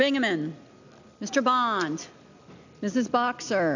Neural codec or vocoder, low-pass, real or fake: none; 7.2 kHz; real